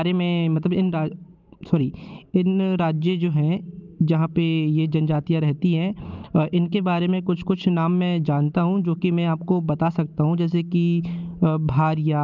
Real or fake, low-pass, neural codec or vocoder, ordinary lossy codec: real; 7.2 kHz; none; Opus, 24 kbps